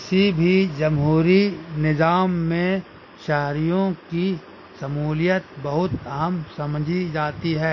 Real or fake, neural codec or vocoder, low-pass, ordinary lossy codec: real; none; 7.2 kHz; MP3, 32 kbps